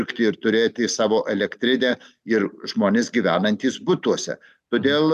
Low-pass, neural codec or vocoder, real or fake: 14.4 kHz; none; real